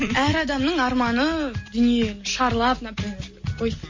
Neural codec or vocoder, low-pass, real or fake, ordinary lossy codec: none; 7.2 kHz; real; MP3, 32 kbps